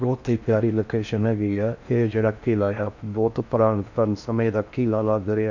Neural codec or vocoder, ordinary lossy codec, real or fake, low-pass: codec, 16 kHz in and 24 kHz out, 0.6 kbps, FocalCodec, streaming, 4096 codes; none; fake; 7.2 kHz